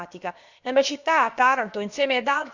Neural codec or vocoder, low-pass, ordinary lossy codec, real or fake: codec, 16 kHz, about 1 kbps, DyCAST, with the encoder's durations; 7.2 kHz; Opus, 64 kbps; fake